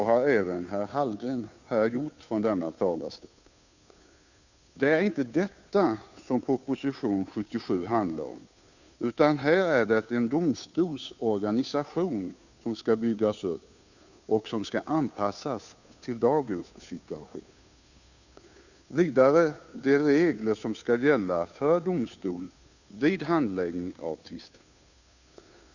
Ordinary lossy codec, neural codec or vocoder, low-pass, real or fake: none; codec, 16 kHz, 2 kbps, FunCodec, trained on Chinese and English, 25 frames a second; 7.2 kHz; fake